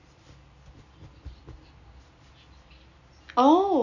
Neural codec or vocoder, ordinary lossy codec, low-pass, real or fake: none; none; 7.2 kHz; real